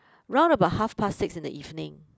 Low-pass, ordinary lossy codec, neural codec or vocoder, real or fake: none; none; none; real